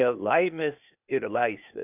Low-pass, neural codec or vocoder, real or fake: 3.6 kHz; codec, 16 kHz, 0.8 kbps, ZipCodec; fake